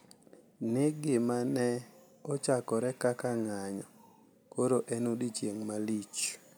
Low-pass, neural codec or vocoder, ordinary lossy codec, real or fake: none; none; none; real